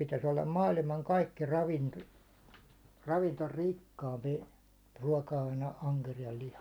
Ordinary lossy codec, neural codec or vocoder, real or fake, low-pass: none; none; real; none